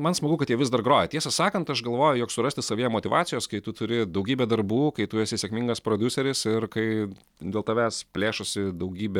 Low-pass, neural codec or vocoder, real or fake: 19.8 kHz; none; real